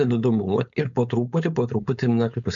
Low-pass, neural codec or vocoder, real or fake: 7.2 kHz; codec, 16 kHz, 4.8 kbps, FACodec; fake